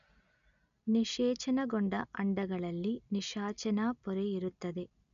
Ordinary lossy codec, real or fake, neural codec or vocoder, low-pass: none; real; none; 7.2 kHz